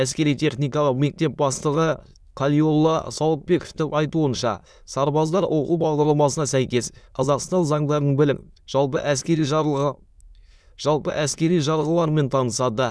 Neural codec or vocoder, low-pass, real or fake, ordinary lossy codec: autoencoder, 22.05 kHz, a latent of 192 numbers a frame, VITS, trained on many speakers; none; fake; none